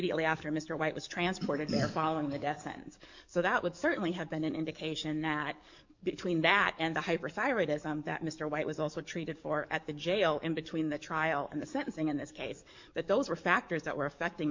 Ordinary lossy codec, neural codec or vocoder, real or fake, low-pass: MP3, 64 kbps; codec, 44.1 kHz, 7.8 kbps, DAC; fake; 7.2 kHz